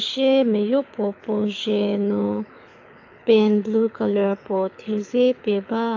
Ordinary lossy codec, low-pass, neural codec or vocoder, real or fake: AAC, 48 kbps; 7.2 kHz; codec, 24 kHz, 6 kbps, HILCodec; fake